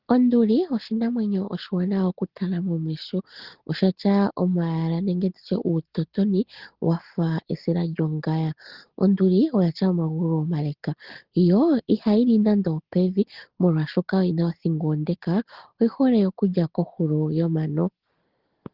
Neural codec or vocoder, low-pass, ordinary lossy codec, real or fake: none; 5.4 kHz; Opus, 16 kbps; real